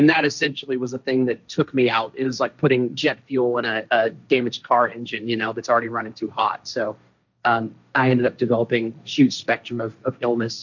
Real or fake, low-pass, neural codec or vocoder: fake; 7.2 kHz; codec, 16 kHz, 1.1 kbps, Voila-Tokenizer